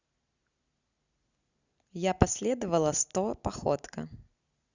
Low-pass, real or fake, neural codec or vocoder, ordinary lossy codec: 7.2 kHz; real; none; Opus, 64 kbps